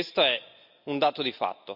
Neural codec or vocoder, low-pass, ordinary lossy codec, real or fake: none; 5.4 kHz; none; real